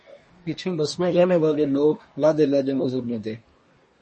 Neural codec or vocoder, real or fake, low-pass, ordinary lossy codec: codec, 24 kHz, 1 kbps, SNAC; fake; 10.8 kHz; MP3, 32 kbps